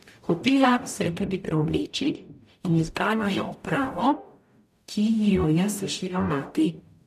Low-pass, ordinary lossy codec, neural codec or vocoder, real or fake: 14.4 kHz; none; codec, 44.1 kHz, 0.9 kbps, DAC; fake